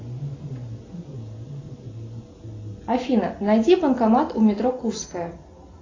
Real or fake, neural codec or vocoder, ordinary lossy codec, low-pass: real; none; AAC, 32 kbps; 7.2 kHz